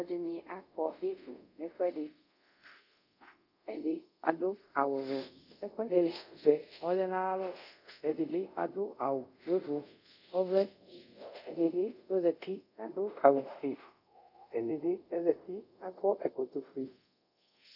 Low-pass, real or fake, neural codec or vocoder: 5.4 kHz; fake; codec, 24 kHz, 0.5 kbps, DualCodec